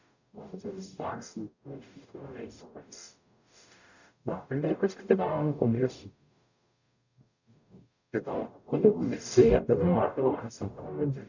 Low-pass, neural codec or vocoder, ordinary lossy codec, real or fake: 7.2 kHz; codec, 44.1 kHz, 0.9 kbps, DAC; none; fake